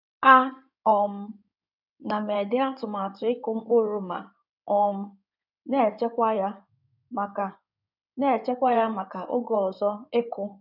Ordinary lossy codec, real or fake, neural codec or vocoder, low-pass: none; fake; codec, 16 kHz in and 24 kHz out, 2.2 kbps, FireRedTTS-2 codec; 5.4 kHz